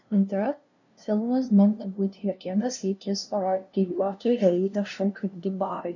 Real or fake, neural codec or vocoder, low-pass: fake; codec, 16 kHz, 0.5 kbps, FunCodec, trained on LibriTTS, 25 frames a second; 7.2 kHz